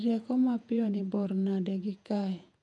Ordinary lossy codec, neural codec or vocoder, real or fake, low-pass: none; vocoder, 48 kHz, 128 mel bands, Vocos; fake; 10.8 kHz